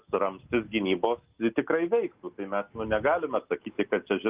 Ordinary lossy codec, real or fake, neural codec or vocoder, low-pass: Opus, 32 kbps; real; none; 3.6 kHz